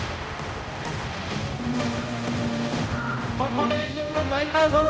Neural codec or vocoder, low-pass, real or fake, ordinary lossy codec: codec, 16 kHz, 0.5 kbps, X-Codec, HuBERT features, trained on general audio; none; fake; none